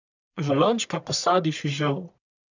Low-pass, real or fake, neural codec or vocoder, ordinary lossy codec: 7.2 kHz; fake; codec, 44.1 kHz, 1.7 kbps, Pupu-Codec; none